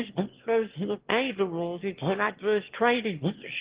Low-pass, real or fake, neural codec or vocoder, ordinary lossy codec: 3.6 kHz; fake; autoencoder, 22.05 kHz, a latent of 192 numbers a frame, VITS, trained on one speaker; Opus, 16 kbps